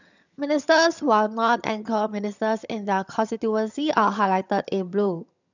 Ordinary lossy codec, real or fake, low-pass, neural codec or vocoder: none; fake; 7.2 kHz; vocoder, 22.05 kHz, 80 mel bands, HiFi-GAN